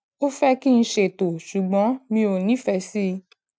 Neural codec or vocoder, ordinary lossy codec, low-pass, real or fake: none; none; none; real